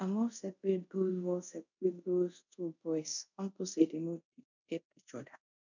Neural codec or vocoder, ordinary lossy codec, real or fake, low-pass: codec, 24 kHz, 0.5 kbps, DualCodec; none; fake; 7.2 kHz